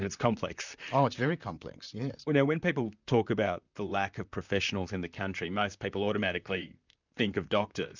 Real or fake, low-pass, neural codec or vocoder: fake; 7.2 kHz; vocoder, 44.1 kHz, 128 mel bands, Pupu-Vocoder